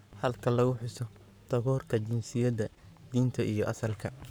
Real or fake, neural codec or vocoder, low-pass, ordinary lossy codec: fake; codec, 44.1 kHz, 7.8 kbps, Pupu-Codec; none; none